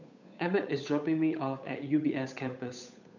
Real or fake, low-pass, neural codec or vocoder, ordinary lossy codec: fake; 7.2 kHz; codec, 16 kHz, 8 kbps, FunCodec, trained on Chinese and English, 25 frames a second; none